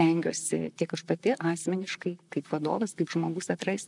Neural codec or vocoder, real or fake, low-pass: vocoder, 44.1 kHz, 128 mel bands, Pupu-Vocoder; fake; 10.8 kHz